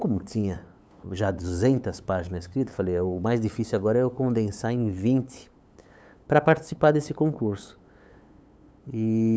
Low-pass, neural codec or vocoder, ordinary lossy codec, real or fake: none; codec, 16 kHz, 8 kbps, FunCodec, trained on LibriTTS, 25 frames a second; none; fake